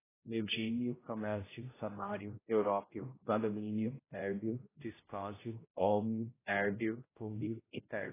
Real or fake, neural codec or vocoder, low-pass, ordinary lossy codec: fake; codec, 16 kHz, 0.5 kbps, X-Codec, HuBERT features, trained on general audio; 3.6 kHz; AAC, 16 kbps